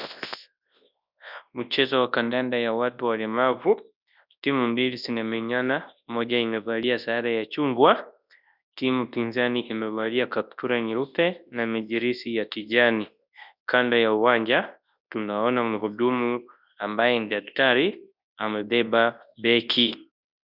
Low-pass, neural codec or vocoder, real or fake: 5.4 kHz; codec, 24 kHz, 0.9 kbps, WavTokenizer, large speech release; fake